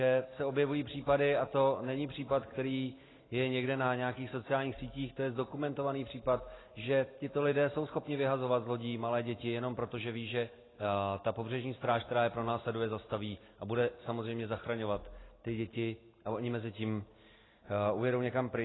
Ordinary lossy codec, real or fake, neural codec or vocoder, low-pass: AAC, 16 kbps; real; none; 7.2 kHz